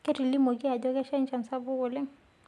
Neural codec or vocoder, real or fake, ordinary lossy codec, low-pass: none; real; none; none